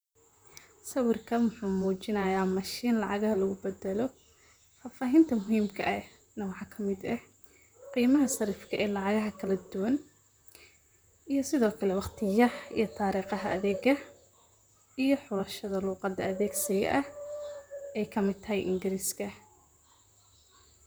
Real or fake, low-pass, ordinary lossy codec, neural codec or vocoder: fake; none; none; vocoder, 44.1 kHz, 128 mel bands, Pupu-Vocoder